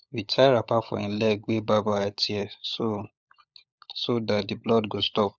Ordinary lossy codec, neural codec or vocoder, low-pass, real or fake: none; codec, 16 kHz, 16 kbps, FunCodec, trained on LibriTTS, 50 frames a second; none; fake